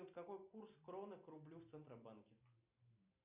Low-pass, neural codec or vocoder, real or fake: 3.6 kHz; none; real